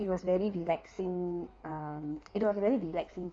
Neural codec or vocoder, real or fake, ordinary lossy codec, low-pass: codec, 16 kHz in and 24 kHz out, 1.1 kbps, FireRedTTS-2 codec; fake; none; 9.9 kHz